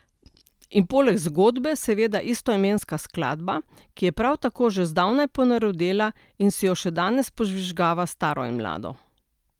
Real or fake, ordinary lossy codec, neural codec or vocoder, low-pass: real; Opus, 32 kbps; none; 19.8 kHz